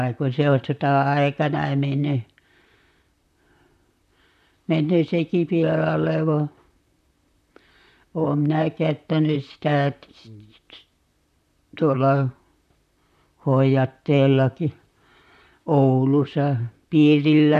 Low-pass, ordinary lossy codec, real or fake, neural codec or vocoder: 14.4 kHz; none; fake; vocoder, 44.1 kHz, 128 mel bands, Pupu-Vocoder